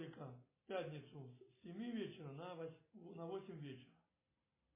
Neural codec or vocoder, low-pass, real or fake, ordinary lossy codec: none; 3.6 kHz; real; MP3, 16 kbps